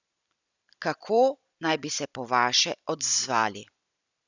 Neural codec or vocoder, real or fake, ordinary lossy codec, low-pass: none; real; none; 7.2 kHz